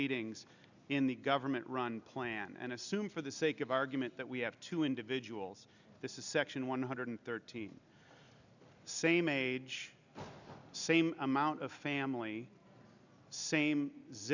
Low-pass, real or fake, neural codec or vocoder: 7.2 kHz; real; none